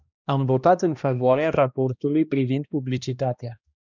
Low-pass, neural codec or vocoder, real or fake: 7.2 kHz; codec, 16 kHz, 1 kbps, X-Codec, HuBERT features, trained on balanced general audio; fake